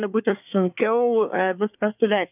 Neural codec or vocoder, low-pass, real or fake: codec, 24 kHz, 1 kbps, SNAC; 3.6 kHz; fake